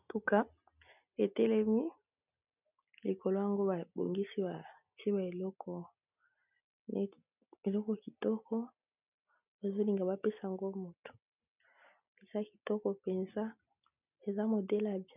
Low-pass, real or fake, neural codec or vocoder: 3.6 kHz; real; none